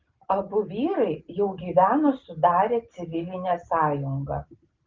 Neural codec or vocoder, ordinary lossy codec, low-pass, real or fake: none; Opus, 24 kbps; 7.2 kHz; real